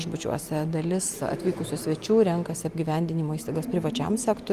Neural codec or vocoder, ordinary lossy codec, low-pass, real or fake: none; Opus, 32 kbps; 14.4 kHz; real